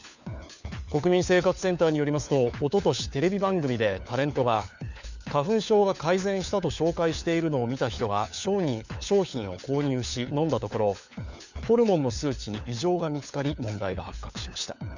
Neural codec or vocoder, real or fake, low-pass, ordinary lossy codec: codec, 16 kHz, 4 kbps, FunCodec, trained on LibriTTS, 50 frames a second; fake; 7.2 kHz; none